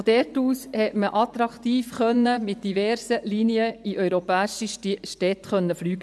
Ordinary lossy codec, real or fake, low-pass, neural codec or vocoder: none; fake; none; vocoder, 24 kHz, 100 mel bands, Vocos